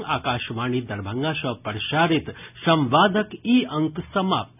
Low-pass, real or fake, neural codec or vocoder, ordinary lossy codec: 3.6 kHz; real; none; none